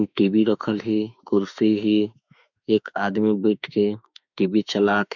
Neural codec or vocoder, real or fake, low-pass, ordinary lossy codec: autoencoder, 48 kHz, 32 numbers a frame, DAC-VAE, trained on Japanese speech; fake; 7.2 kHz; none